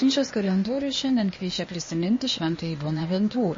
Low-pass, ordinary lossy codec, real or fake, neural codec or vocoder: 7.2 kHz; MP3, 32 kbps; fake; codec, 16 kHz, 0.8 kbps, ZipCodec